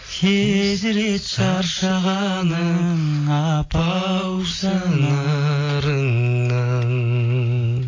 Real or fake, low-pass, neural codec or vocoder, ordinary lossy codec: real; 7.2 kHz; none; AAC, 32 kbps